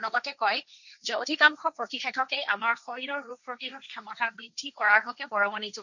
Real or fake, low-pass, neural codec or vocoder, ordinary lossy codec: fake; 7.2 kHz; codec, 16 kHz, 1.1 kbps, Voila-Tokenizer; none